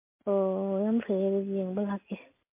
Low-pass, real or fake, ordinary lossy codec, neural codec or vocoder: 3.6 kHz; real; MP3, 24 kbps; none